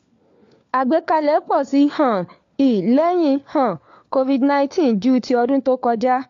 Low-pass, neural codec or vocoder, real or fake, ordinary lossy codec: 7.2 kHz; codec, 16 kHz, 4 kbps, FunCodec, trained on LibriTTS, 50 frames a second; fake; AAC, 48 kbps